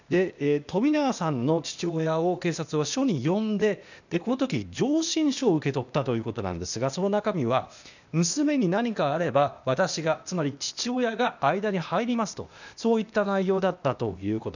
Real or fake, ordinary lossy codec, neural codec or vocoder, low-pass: fake; none; codec, 16 kHz, 0.8 kbps, ZipCodec; 7.2 kHz